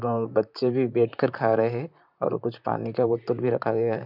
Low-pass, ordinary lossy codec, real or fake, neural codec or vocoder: 5.4 kHz; none; fake; vocoder, 44.1 kHz, 128 mel bands, Pupu-Vocoder